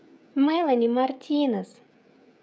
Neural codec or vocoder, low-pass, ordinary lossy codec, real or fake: codec, 16 kHz, 16 kbps, FreqCodec, smaller model; none; none; fake